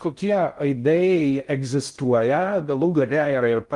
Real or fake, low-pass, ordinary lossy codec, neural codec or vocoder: fake; 10.8 kHz; Opus, 32 kbps; codec, 16 kHz in and 24 kHz out, 0.6 kbps, FocalCodec, streaming, 2048 codes